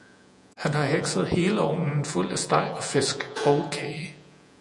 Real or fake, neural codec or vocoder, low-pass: fake; vocoder, 48 kHz, 128 mel bands, Vocos; 10.8 kHz